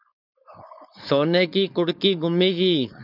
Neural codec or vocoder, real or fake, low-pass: codec, 16 kHz, 4.8 kbps, FACodec; fake; 5.4 kHz